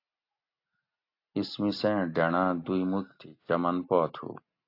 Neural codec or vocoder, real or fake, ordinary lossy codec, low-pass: none; real; AAC, 32 kbps; 5.4 kHz